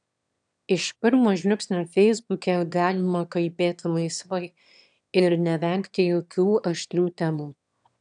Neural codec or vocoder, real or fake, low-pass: autoencoder, 22.05 kHz, a latent of 192 numbers a frame, VITS, trained on one speaker; fake; 9.9 kHz